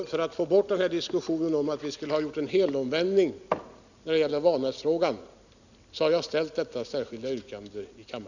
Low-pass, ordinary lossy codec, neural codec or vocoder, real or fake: 7.2 kHz; none; none; real